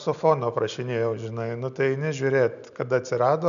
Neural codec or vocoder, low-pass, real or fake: none; 7.2 kHz; real